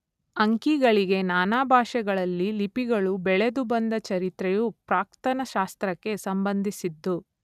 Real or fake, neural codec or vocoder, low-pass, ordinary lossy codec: real; none; 14.4 kHz; none